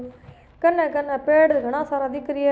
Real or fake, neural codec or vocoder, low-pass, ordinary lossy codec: real; none; none; none